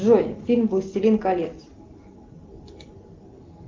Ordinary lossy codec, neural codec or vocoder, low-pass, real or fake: Opus, 32 kbps; none; 7.2 kHz; real